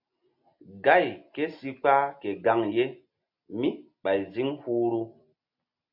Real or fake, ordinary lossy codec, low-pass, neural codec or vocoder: real; MP3, 48 kbps; 5.4 kHz; none